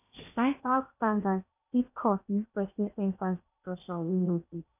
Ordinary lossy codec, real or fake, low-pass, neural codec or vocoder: none; fake; 3.6 kHz; codec, 16 kHz in and 24 kHz out, 0.8 kbps, FocalCodec, streaming, 65536 codes